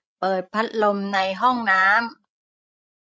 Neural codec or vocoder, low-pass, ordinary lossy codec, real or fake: codec, 16 kHz, 16 kbps, FreqCodec, larger model; none; none; fake